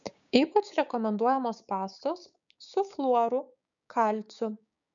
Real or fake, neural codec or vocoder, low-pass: fake; codec, 16 kHz, 6 kbps, DAC; 7.2 kHz